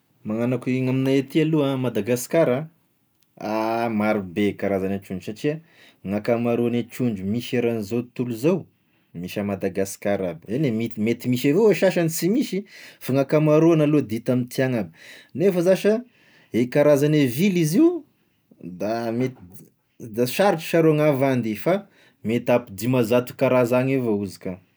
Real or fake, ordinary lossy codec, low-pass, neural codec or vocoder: real; none; none; none